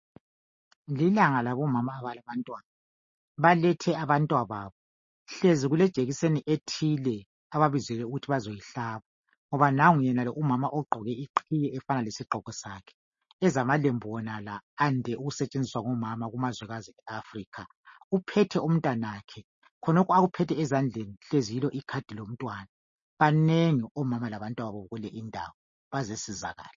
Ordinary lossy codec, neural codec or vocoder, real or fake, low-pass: MP3, 32 kbps; none; real; 7.2 kHz